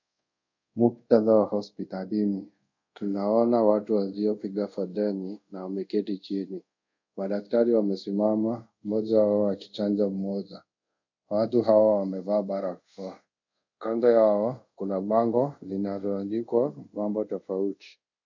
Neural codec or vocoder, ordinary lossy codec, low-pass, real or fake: codec, 24 kHz, 0.5 kbps, DualCodec; MP3, 64 kbps; 7.2 kHz; fake